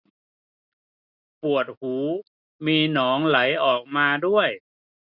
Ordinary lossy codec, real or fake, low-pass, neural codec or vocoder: none; real; 5.4 kHz; none